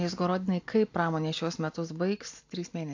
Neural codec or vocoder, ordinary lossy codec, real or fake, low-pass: none; AAC, 48 kbps; real; 7.2 kHz